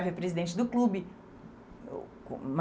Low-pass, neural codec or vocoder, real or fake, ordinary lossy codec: none; none; real; none